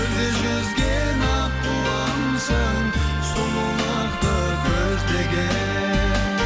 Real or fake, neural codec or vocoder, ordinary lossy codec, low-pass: real; none; none; none